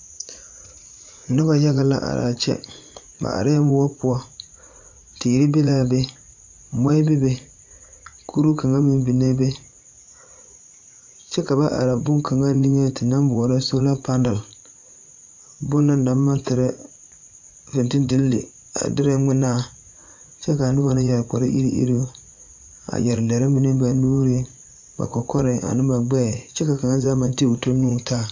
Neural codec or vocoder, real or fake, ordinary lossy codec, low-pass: vocoder, 44.1 kHz, 80 mel bands, Vocos; fake; MP3, 64 kbps; 7.2 kHz